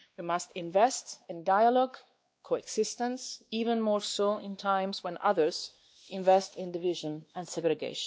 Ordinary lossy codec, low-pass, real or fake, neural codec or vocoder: none; none; fake; codec, 16 kHz, 2 kbps, X-Codec, WavLM features, trained on Multilingual LibriSpeech